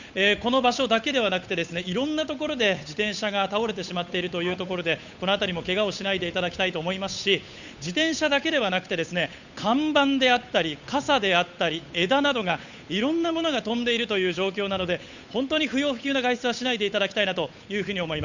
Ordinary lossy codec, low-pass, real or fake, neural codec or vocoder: none; 7.2 kHz; fake; codec, 16 kHz, 8 kbps, FunCodec, trained on Chinese and English, 25 frames a second